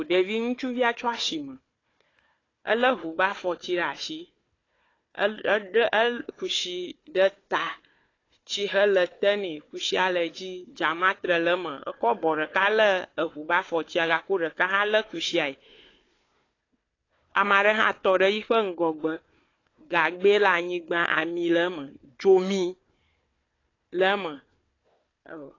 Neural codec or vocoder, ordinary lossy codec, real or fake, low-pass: codec, 16 kHz, 4 kbps, FunCodec, trained on Chinese and English, 50 frames a second; AAC, 32 kbps; fake; 7.2 kHz